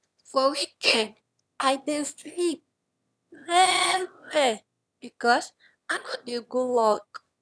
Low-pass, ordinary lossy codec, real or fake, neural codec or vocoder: none; none; fake; autoencoder, 22.05 kHz, a latent of 192 numbers a frame, VITS, trained on one speaker